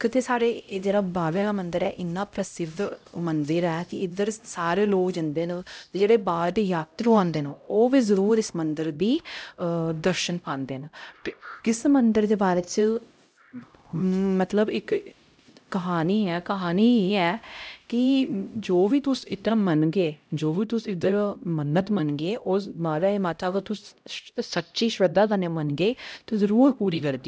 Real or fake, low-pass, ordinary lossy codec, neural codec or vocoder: fake; none; none; codec, 16 kHz, 0.5 kbps, X-Codec, HuBERT features, trained on LibriSpeech